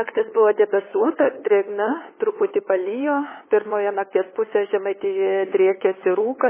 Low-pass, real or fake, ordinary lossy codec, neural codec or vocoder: 3.6 kHz; fake; MP3, 16 kbps; codec, 16 kHz, 8 kbps, FunCodec, trained on LibriTTS, 25 frames a second